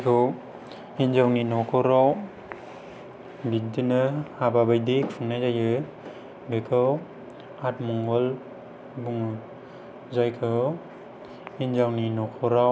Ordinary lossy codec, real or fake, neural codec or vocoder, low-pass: none; real; none; none